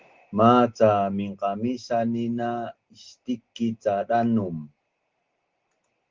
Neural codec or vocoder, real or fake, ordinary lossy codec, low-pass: none; real; Opus, 16 kbps; 7.2 kHz